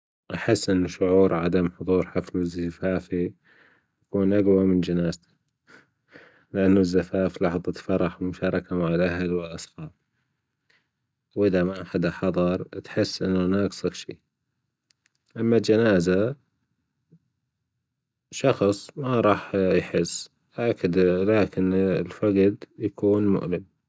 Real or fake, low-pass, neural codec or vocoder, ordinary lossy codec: real; none; none; none